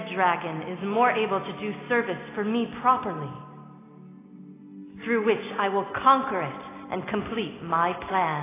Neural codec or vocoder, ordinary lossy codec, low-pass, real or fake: none; AAC, 16 kbps; 3.6 kHz; real